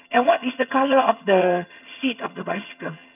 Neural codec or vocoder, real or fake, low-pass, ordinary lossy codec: vocoder, 22.05 kHz, 80 mel bands, HiFi-GAN; fake; 3.6 kHz; none